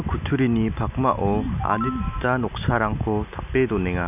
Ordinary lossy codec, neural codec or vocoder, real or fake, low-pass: none; none; real; 3.6 kHz